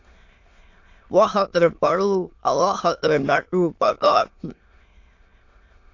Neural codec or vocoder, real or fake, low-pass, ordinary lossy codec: autoencoder, 22.05 kHz, a latent of 192 numbers a frame, VITS, trained on many speakers; fake; 7.2 kHz; AAC, 48 kbps